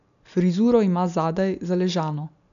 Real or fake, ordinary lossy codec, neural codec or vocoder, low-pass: real; none; none; 7.2 kHz